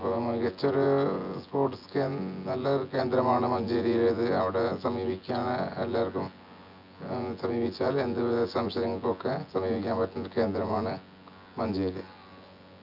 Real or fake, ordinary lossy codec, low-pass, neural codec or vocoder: fake; none; 5.4 kHz; vocoder, 24 kHz, 100 mel bands, Vocos